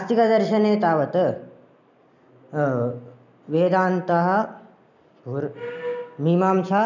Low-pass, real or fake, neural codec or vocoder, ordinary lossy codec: 7.2 kHz; real; none; none